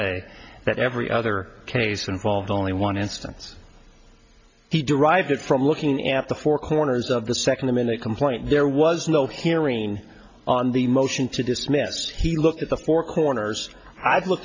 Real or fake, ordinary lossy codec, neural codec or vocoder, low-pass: real; MP3, 48 kbps; none; 7.2 kHz